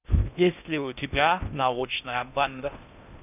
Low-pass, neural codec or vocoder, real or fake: 3.6 kHz; codec, 16 kHz in and 24 kHz out, 0.6 kbps, FocalCodec, streaming, 4096 codes; fake